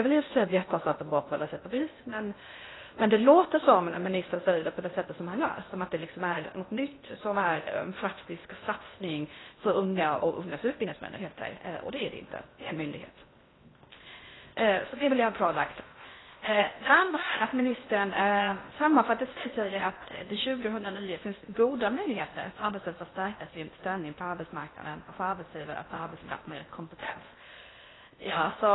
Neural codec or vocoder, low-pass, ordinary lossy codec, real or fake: codec, 16 kHz in and 24 kHz out, 0.6 kbps, FocalCodec, streaming, 2048 codes; 7.2 kHz; AAC, 16 kbps; fake